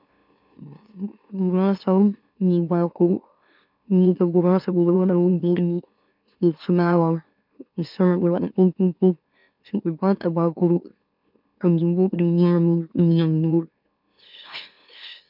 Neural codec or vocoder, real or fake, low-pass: autoencoder, 44.1 kHz, a latent of 192 numbers a frame, MeloTTS; fake; 5.4 kHz